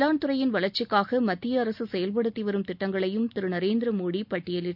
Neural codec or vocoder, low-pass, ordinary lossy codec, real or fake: none; 5.4 kHz; none; real